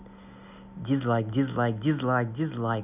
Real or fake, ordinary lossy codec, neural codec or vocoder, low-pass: real; none; none; 3.6 kHz